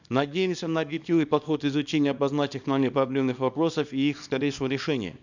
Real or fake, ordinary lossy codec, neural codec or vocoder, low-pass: fake; none; codec, 24 kHz, 0.9 kbps, WavTokenizer, small release; 7.2 kHz